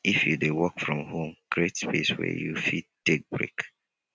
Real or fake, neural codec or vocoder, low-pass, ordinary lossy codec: real; none; none; none